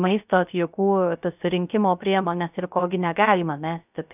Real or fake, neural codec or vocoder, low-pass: fake; codec, 16 kHz, 0.3 kbps, FocalCodec; 3.6 kHz